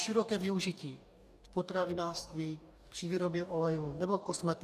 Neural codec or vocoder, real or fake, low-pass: codec, 44.1 kHz, 2.6 kbps, DAC; fake; 14.4 kHz